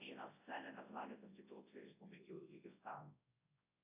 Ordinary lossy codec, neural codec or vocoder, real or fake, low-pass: AAC, 24 kbps; codec, 24 kHz, 0.9 kbps, WavTokenizer, large speech release; fake; 3.6 kHz